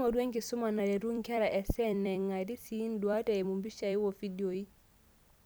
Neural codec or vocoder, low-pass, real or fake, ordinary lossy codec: vocoder, 44.1 kHz, 128 mel bands every 256 samples, BigVGAN v2; none; fake; none